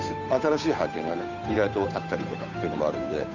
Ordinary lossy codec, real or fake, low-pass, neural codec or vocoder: none; fake; 7.2 kHz; codec, 16 kHz, 8 kbps, FunCodec, trained on Chinese and English, 25 frames a second